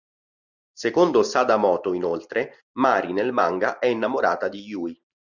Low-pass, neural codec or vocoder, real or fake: 7.2 kHz; none; real